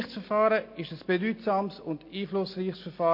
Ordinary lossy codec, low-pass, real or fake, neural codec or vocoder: MP3, 32 kbps; 5.4 kHz; real; none